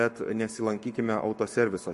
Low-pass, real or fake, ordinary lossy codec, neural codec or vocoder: 14.4 kHz; fake; MP3, 48 kbps; codec, 44.1 kHz, 7.8 kbps, Pupu-Codec